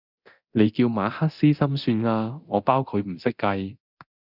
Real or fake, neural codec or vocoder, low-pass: fake; codec, 24 kHz, 0.9 kbps, DualCodec; 5.4 kHz